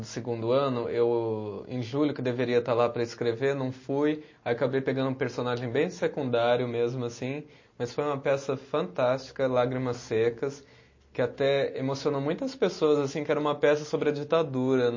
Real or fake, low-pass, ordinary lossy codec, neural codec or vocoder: real; 7.2 kHz; MP3, 32 kbps; none